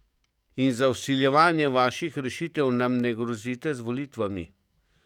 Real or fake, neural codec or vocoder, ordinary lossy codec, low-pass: fake; codec, 44.1 kHz, 7.8 kbps, DAC; none; 19.8 kHz